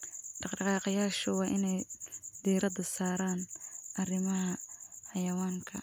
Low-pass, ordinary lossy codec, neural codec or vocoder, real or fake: none; none; none; real